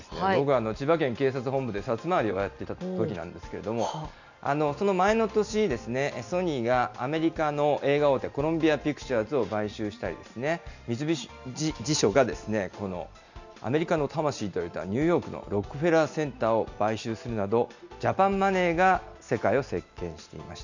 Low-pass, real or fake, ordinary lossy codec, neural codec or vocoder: 7.2 kHz; real; none; none